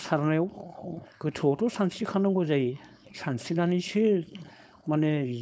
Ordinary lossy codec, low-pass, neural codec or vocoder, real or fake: none; none; codec, 16 kHz, 4.8 kbps, FACodec; fake